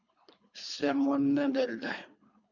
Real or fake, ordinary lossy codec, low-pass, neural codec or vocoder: fake; MP3, 64 kbps; 7.2 kHz; codec, 24 kHz, 3 kbps, HILCodec